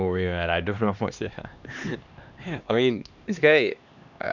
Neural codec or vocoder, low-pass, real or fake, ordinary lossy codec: codec, 16 kHz, 2 kbps, X-Codec, HuBERT features, trained on LibriSpeech; 7.2 kHz; fake; none